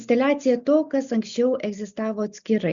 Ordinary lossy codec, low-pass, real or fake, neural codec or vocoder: Opus, 64 kbps; 7.2 kHz; real; none